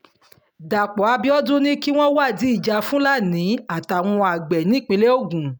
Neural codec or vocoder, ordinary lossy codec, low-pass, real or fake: none; none; none; real